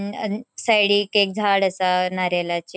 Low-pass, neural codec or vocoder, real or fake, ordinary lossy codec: none; none; real; none